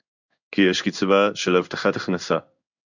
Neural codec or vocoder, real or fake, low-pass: codec, 16 kHz in and 24 kHz out, 1 kbps, XY-Tokenizer; fake; 7.2 kHz